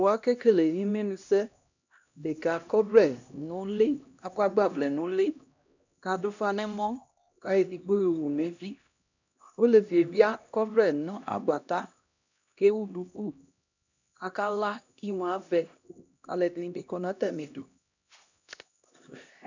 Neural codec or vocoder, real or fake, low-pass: codec, 16 kHz, 1 kbps, X-Codec, HuBERT features, trained on LibriSpeech; fake; 7.2 kHz